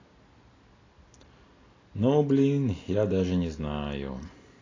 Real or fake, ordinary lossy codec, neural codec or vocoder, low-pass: real; AAC, 32 kbps; none; 7.2 kHz